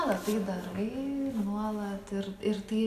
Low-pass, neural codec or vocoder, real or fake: 14.4 kHz; none; real